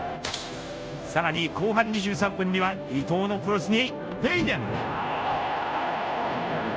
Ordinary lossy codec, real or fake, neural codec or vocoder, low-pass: none; fake; codec, 16 kHz, 0.5 kbps, FunCodec, trained on Chinese and English, 25 frames a second; none